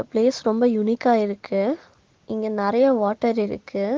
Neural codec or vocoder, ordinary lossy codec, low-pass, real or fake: none; Opus, 16 kbps; 7.2 kHz; real